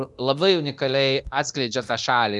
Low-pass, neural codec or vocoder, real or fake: 10.8 kHz; codec, 24 kHz, 0.9 kbps, DualCodec; fake